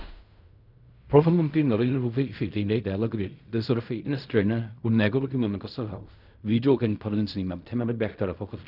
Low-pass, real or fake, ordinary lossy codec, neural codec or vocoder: 5.4 kHz; fake; none; codec, 16 kHz in and 24 kHz out, 0.4 kbps, LongCat-Audio-Codec, fine tuned four codebook decoder